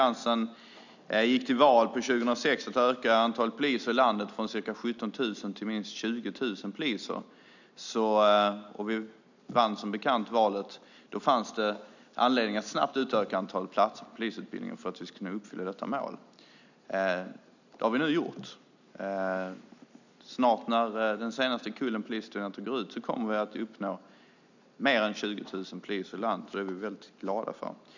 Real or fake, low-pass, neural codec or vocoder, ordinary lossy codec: real; 7.2 kHz; none; none